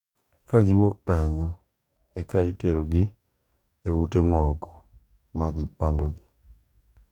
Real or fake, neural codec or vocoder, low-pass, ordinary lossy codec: fake; codec, 44.1 kHz, 2.6 kbps, DAC; 19.8 kHz; none